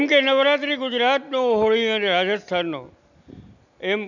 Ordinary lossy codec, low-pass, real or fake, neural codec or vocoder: none; 7.2 kHz; real; none